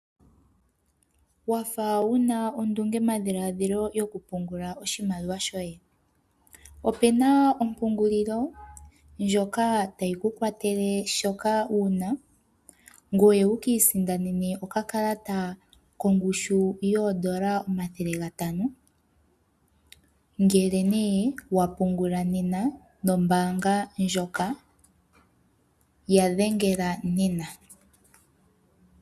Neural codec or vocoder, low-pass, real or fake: none; 14.4 kHz; real